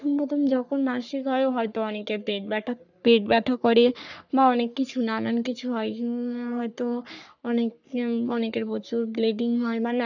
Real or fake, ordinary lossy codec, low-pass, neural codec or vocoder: fake; none; 7.2 kHz; codec, 44.1 kHz, 3.4 kbps, Pupu-Codec